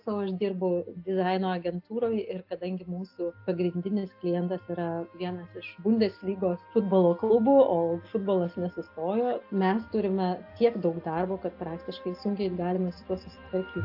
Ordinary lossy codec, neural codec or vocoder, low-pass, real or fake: AAC, 48 kbps; none; 5.4 kHz; real